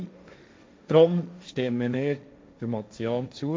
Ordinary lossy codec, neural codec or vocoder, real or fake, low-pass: none; codec, 16 kHz, 1.1 kbps, Voila-Tokenizer; fake; none